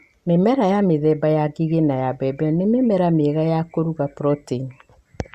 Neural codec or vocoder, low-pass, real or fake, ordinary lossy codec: none; 14.4 kHz; real; Opus, 64 kbps